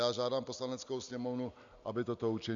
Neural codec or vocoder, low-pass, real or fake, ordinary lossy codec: none; 7.2 kHz; real; MP3, 64 kbps